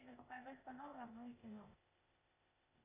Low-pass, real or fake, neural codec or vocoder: 3.6 kHz; fake; codec, 16 kHz, 0.8 kbps, ZipCodec